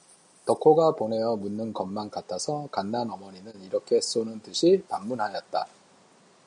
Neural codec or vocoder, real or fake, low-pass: none; real; 9.9 kHz